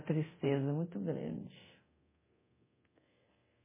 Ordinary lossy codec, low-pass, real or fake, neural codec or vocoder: MP3, 16 kbps; 3.6 kHz; fake; codec, 24 kHz, 0.9 kbps, DualCodec